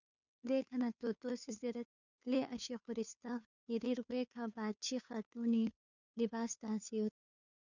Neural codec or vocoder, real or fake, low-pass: codec, 16 kHz, 2 kbps, FunCodec, trained on Chinese and English, 25 frames a second; fake; 7.2 kHz